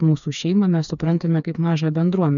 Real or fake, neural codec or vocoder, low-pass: fake; codec, 16 kHz, 4 kbps, FreqCodec, smaller model; 7.2 kHz